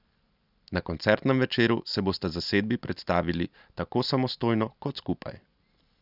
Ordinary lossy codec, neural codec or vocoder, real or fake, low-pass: none; none; real; 5.4 kHz